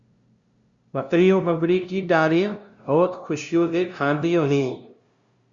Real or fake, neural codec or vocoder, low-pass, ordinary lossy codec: fake; codec, 16 kHz, 0.5 kbps, FunCodec, trained on LibriTTS, 25 frames a second; 7.2 kHz; Opus, 64 kbps